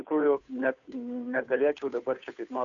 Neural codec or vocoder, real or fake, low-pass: codec, 16 kHz, 2 kbps, FunCodec, trained on Chinese and English, 25 frames a second; fake; 7.2 kHz